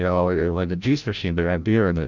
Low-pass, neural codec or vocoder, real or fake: 7.2 kHz; codec, 16 kHz, 0.5 kbps, FreqCodec, larger model; fake